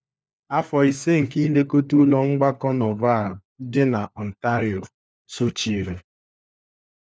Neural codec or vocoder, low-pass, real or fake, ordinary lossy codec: codec, 16 kHz, 4 kbps, FunCodec, trained on LibriTTS, 50 frames a second; none; fake; none